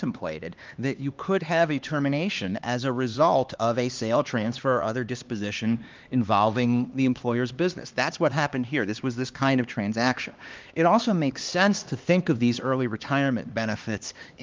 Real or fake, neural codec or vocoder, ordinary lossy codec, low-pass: fake; codec, 16 kHz, 2 kbps, X-Codec, HuBERT features, trained on LibriSpeech; Opus, 32 kbps; 7.2 kHz